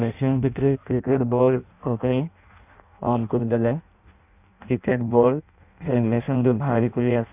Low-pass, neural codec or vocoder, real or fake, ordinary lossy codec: 3.6 kHz; codec, 16 kHz in and 24 kHz out, 0.6 kbps, FireRedTTS-2 codec; fake; none